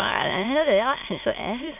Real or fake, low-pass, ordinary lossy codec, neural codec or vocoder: fake; 3.6 kHz; MP3, 32 kbps; autoencoder, 22.05 kHz, a latent of 192 numbers a frame, VITS, trained on many speakers